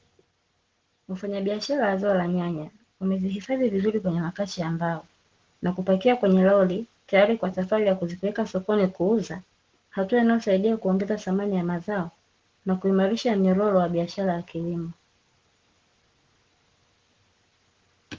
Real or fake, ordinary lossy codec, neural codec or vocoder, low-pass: real; Opus, 16 kbps; none; 7.2 kHz